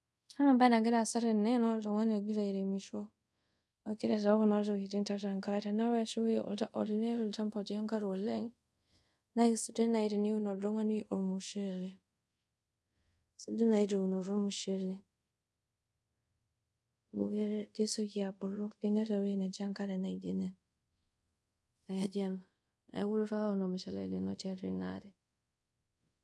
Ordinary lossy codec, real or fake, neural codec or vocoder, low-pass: none; fake; codec, 24 kHz, 0.5 kbps, DualCodec; none